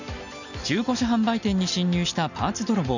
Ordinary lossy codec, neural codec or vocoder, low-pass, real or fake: none; none; 7.2 kHz; real